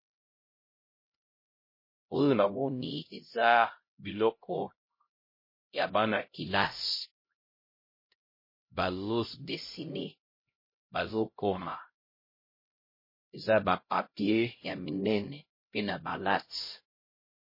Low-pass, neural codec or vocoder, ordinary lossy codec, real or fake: 5.4 kHz; codec, 16 kHz, 0.5 kbps, X-Codec, HuBERT features, trained on LibriSpeech; MP3, 24 kbps; fake